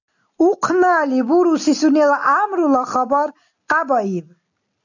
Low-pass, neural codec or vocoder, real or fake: 7.2 kHz; none; real